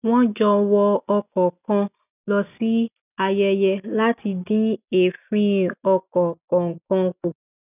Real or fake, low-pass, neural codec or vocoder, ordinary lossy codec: real; 3.6 kHz; none; none